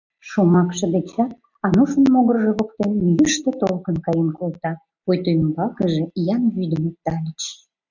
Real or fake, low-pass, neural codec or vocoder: real; 7.2 kHz; none